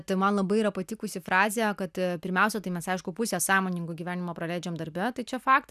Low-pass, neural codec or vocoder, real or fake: 14.4 kHz; none; real